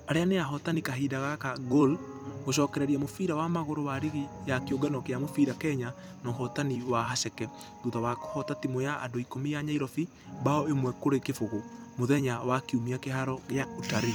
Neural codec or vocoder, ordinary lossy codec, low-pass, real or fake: vocoder, 44.1 kHz, 128 mel bands every 256 samples, BigVGAN v2; none; none; fake